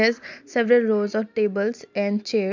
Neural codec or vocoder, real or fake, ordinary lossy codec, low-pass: none; real; MP3, 64 kbps; 7.2 kHz